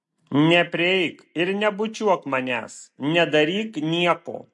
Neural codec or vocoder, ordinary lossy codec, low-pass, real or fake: none; MP3, 48 kbps; 10.8 kHz; real